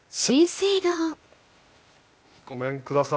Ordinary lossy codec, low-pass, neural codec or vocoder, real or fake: none; none; codec, 16 kHz, 0.8 kbps, ZipCodec; fake